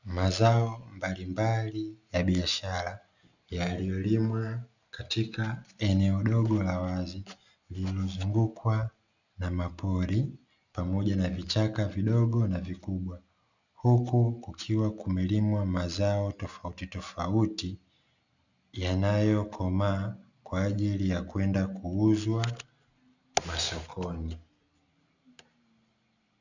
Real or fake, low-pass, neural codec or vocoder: real; 7.2 kHz; none